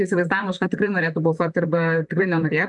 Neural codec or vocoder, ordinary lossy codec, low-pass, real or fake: vocoder, 44.1 kHz, 128 mel bands, Pupu-Vocoder; MP3, 96 kbps; 10.8 kHz; fake